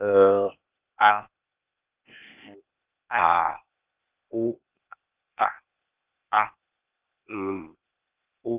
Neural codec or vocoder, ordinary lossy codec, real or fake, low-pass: codec, 16 kHz, 0.8 kbps, ZipCodec; Opus, 24 kbps; fake; 3.6 kHz